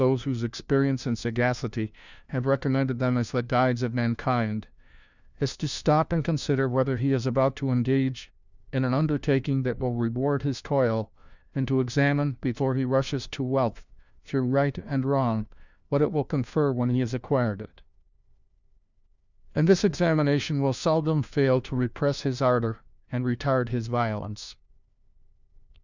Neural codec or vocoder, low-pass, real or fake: codec, 16 kHz, 1 kbps, FunCodec, trained on LibriTTS, 50 frames a second; 7.2 kHz; fake